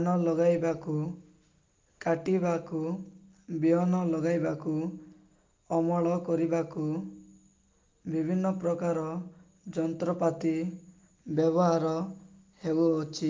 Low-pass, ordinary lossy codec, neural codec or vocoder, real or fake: 7.2 kHz; Opus, 32 kbps; none; real